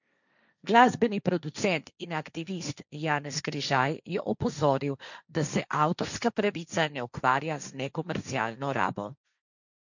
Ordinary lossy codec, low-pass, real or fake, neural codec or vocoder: none; 7.2 kHz; fake; codec, 16 kHz, 1.1 kbps, Voila-Tokenizer